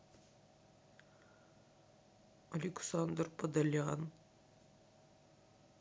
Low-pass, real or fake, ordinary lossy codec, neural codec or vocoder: none; real; none; none